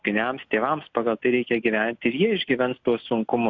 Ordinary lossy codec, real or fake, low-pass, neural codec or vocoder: AAC, 48 kbps; real; 7.2 kHz; none